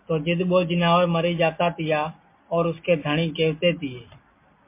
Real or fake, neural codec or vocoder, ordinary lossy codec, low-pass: real; none; MP3, 24 kbps; 3.6 kHz